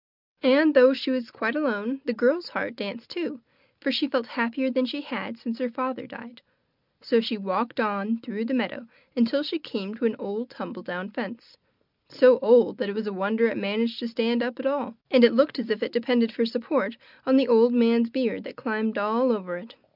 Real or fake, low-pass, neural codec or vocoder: real; 5.4 kHz; none